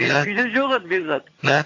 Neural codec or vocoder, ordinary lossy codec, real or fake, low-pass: vocoder, 22.05 kHz, 80 mel bands, HiFi-GAN; AAC, 48 kbps; fake; 7.2 kHz